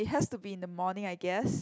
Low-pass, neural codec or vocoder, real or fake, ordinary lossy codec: none; none; real; none